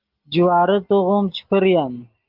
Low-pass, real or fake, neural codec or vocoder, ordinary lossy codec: 5.4 kHz; real; none; Opus, 32 kbps